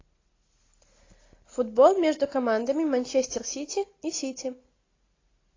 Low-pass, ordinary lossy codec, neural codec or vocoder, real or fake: 7.2 kHz; AAC, 32 kbps; none; real